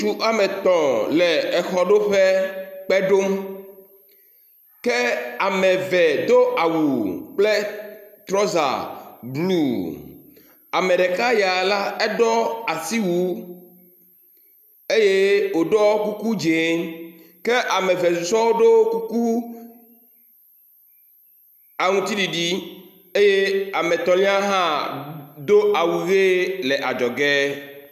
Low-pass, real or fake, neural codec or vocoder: 14.4 kHz; real; none